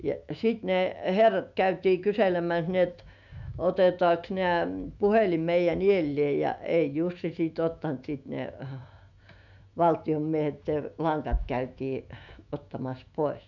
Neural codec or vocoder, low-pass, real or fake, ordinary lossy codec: autoencoder, 48 kHz, 128 numbers a frame, DAC-VAE, trained on Japanese speech; 7.2 kHz; fake; none